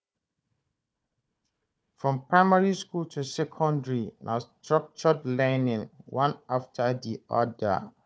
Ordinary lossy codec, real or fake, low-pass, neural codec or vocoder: none; fake; none; codec, 16 kHz, 4 kbps, FunCodec, trained on Chinese and English, 50 frames a second